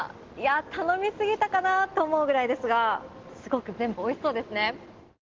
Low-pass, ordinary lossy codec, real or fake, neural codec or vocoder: 7.2 kHz; Opus, 16 kbps; real; none